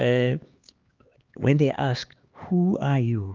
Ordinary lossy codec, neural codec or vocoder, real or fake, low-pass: Opus, 24 kbps; codec, 16 kHz, 2 kbps, X-Codec, HuBERT features, trained on LibriSpeech; fake; 7.2 kHz